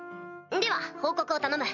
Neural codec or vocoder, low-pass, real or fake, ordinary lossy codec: none; 7.2 kHz; real; none